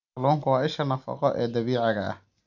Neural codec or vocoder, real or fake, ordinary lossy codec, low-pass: none; real; none; 7.2 kHz